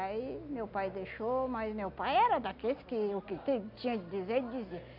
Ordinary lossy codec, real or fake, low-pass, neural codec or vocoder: AAC, 48 kbps; real; 5.4 kHz; none